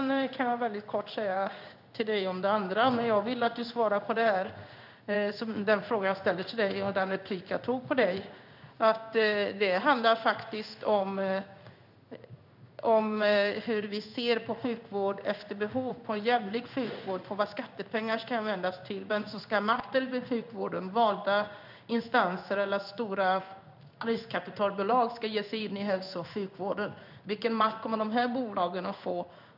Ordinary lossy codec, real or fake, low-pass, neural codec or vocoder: none; fake; 5.4 kHz; codec, 16 kHz in and 24 kHz out, 1 kbps, XY-Tokenizer